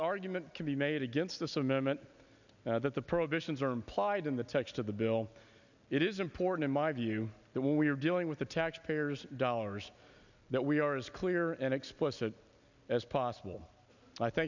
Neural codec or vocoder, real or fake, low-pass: none; real; 7.2 kHz